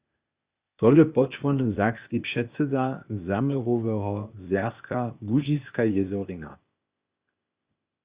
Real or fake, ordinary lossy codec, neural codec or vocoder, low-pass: fake; Opus, 64 kbps; codec, 16 kHz, 0.8 kbps, ZipCodec; 3.6 kHz